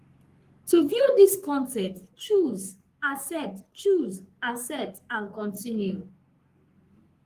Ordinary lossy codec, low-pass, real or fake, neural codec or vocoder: Opus, 24 kbps; 14.4 kHz; fake; codec, 44.1 kHz, 3.4 kbps, Pupu-Codec